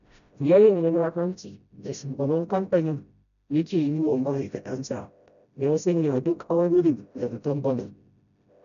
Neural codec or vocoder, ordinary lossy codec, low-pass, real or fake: codec, 16 kHz, 0.5 kbps, FreqCodec, smaller model; none; 7.2 kHz; fake